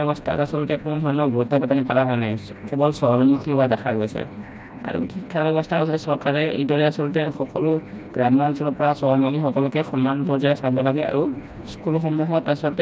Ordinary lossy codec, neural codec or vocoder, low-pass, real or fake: none; codec, 16 kHz, 1 kbps, FreqCodec, smaller model; none; fake